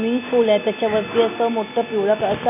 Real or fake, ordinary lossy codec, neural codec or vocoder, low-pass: real; none; none; 3.6 kHz